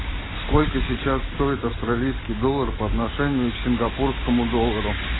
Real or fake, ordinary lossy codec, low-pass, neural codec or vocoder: real; AAC, 16 kbps; 7.2 kHz; none